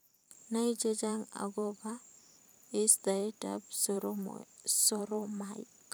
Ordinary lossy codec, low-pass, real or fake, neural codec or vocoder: none; none; fake; vocoder, 44.1 kHz, 128 mel bands every 512 samples, BigVGAN v2